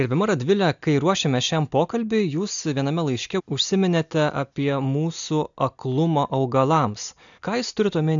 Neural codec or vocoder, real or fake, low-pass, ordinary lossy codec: none; real; 7.2 kHz; MP3, 96 kbps